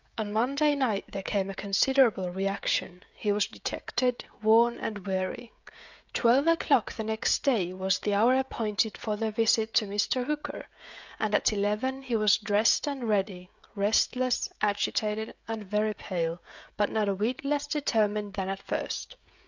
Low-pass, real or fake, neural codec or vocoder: 7.2 kHz; fake; codec, 16 kHz, 16 kbps, FreqCodec, smaller model